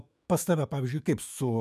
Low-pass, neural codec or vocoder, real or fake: 14.4 kHz; autoencoder, 48 kHz, 128 numbers a frame, DAC-VAE, trained on Japanese speech; fake